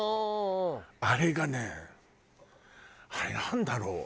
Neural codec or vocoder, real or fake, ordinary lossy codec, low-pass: none; real; none; none